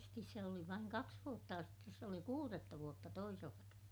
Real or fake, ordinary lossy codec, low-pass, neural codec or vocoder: real; none; none; none